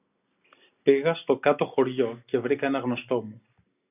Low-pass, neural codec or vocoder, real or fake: 3.6 kHz; none; real